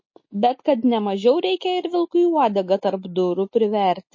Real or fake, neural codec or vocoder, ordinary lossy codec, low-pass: real; none; MP3, 32 kbps; 7.2 kHz